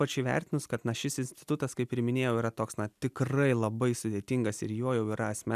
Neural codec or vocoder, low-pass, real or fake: none; 14.4 kHz; real